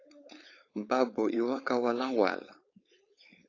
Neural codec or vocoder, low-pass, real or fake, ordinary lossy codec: codec, 16 kHz, 4.8 kbps, FACodec; 7.2 kHz; fake; AAC, 32 kbps